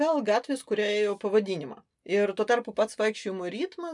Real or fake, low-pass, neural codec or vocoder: real; 10.8 kHz; none